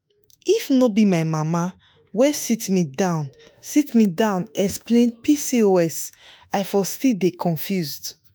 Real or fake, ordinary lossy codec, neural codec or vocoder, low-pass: fake; none; autoencoder, 48 kHz, 32 numbers a frame, DAC-VAE, trained on Japanese speech; none